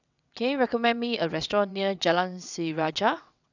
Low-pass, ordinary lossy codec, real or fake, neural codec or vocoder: 7.2 kHz; none; fake; vocoder, 22.05 kHz, 80 mel bands, WaveNeXt